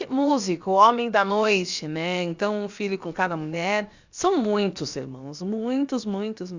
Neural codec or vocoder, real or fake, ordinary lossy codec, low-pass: codec, 16 kHz, about 1 kbps, DyCAST, with the encoder's durations; fake; Opus, 64 kbps; 7.2 kHz